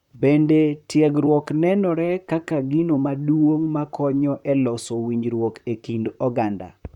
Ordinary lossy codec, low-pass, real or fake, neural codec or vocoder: none; 19.8 kHz; fake; vocoder, 44.1 kHz, 128 mel bands, Pupu-Vocoder